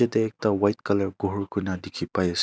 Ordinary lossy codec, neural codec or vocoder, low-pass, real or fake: none; none; none; real